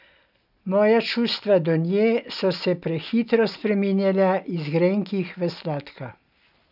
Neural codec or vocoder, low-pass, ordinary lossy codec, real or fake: none; 5.4 kHz; none; real